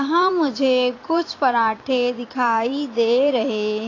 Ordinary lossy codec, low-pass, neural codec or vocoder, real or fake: AAC, 32 kbps; 7.2 kHz; vocoder, 44.1 kHz, 128 mel bands every 256 samples, BigVGAN v2; fake